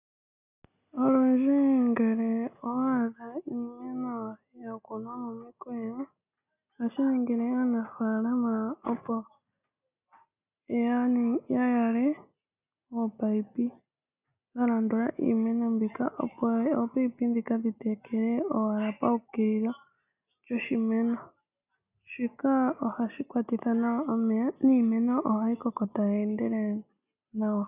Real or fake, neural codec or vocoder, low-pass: real; none; 3.6 kHz